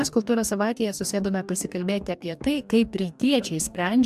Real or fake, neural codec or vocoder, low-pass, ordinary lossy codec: fake; codec, 44.1 kHz, 2.6 kbps, DAC; 14.4 kHz; AAC, 96 kbps